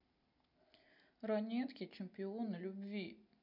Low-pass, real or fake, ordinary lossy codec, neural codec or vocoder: 5.4 kHz; real; none; none